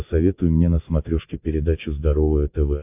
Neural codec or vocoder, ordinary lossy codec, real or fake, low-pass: none; AAC, 32 kbps; real; 3.6 kHz